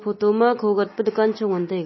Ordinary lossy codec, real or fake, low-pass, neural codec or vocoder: MP3, 24 kbps; real; 7.2 kHz; none